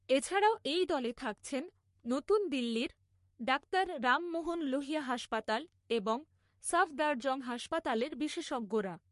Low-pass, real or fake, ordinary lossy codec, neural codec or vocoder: 14.4 kHz; fake; MP3, 48 kbps; codec, 44.1 kHz, 3.4 kbps, Pupu-Codec